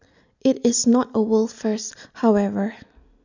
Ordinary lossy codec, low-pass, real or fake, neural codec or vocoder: none; 7.2 kHz; real; none